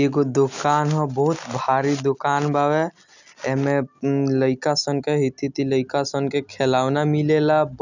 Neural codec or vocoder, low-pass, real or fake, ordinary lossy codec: none; 7.2 kHz; real; none